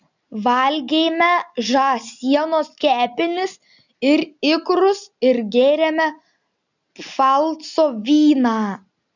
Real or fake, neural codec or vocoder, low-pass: real; none; 7.2 kHz